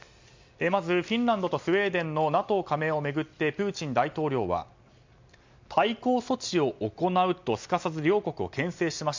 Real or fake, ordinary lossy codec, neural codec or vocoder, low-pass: real; MP3, 64 kbps; none; 7.2 kHz